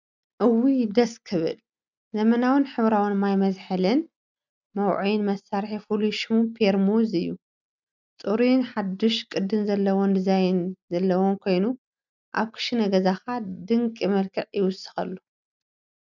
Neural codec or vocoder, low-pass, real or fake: none; 7.2 kHz; real